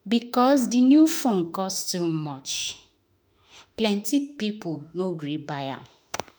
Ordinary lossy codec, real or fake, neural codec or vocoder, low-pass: none; fake; autoencoder, 48 kHz, 32 numbers a frame, DAC-VAE, trained on Japanese speech; none